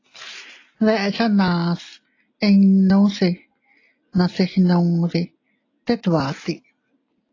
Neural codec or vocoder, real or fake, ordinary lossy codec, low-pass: none; real; AAC, 32 kbps; 7.2 kHz